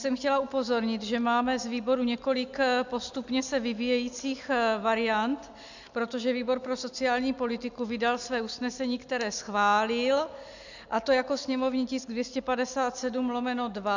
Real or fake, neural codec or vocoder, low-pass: real; none; 7.2 kHz